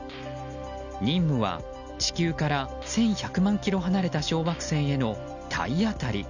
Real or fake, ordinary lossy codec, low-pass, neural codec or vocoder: real; none; 7.2 kHz; none